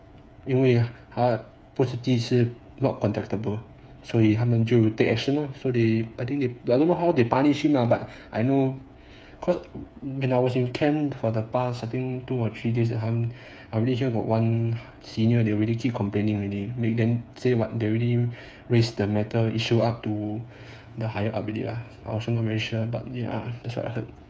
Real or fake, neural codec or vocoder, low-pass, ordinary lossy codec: fake; codec, 16 kHz, 8 kbps, FreqCodec, smaller model; none; none